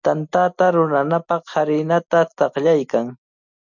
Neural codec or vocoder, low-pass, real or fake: none; 7.2 kHz; real